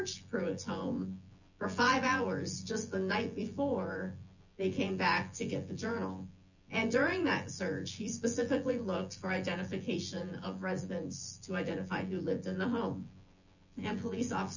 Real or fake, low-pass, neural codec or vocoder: fake; 7.2 kHz; vocoder, 24 kHz, 100 mel bands, Vocos